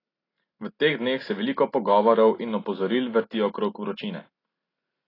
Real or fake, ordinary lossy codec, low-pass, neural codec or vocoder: real; AAC, 24 kbps; 5.4 kHz; none